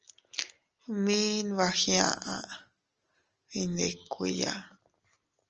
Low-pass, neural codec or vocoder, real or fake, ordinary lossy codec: 7.2 kHz; none; real; Opus, 24 kbps